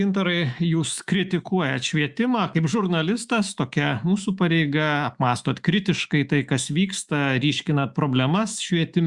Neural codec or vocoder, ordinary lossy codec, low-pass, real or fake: autoencoder, 48 kHz, 128 numbers a frame, DAC-VAE, trained on Japanese speech; Opus, 64 kbps; 10.8 kHz; fake